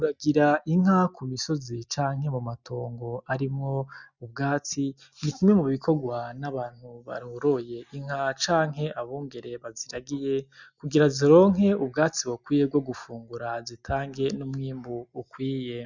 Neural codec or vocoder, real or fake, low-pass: none; real; 7.2 kHz